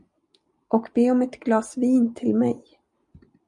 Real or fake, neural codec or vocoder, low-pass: real; none; 10.8 kHz